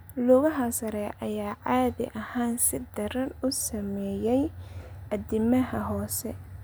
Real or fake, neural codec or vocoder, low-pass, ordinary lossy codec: real; none; none; none